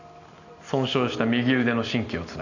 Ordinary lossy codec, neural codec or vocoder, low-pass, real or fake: none; none; 7.2 kHz; real